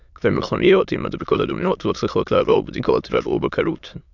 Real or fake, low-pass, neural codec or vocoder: fake; 7.2 kHz; autoencoder, 22.05 kHz, a latent of 192 numbers a frame, VITS, trained on many speakers